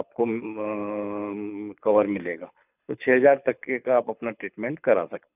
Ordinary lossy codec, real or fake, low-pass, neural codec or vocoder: none; fake; 3.6 kHz; codec, 24 kHz, 6 kbps, HILCodec